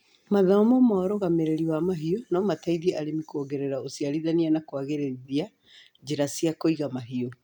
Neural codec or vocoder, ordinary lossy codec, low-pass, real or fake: none; none; 19.8 kHz; real